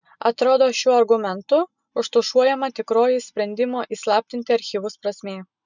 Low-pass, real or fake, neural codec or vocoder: 7.2 kHz; real; none